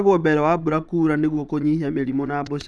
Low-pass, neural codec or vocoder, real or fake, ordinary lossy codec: none; vocoder, 22.05 kHz, 80 mel bands, Vocos; fake; none